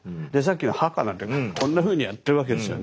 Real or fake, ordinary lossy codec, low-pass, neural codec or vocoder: real; none; none; none